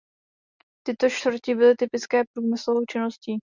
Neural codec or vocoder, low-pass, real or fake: none; 7.2 kHz; real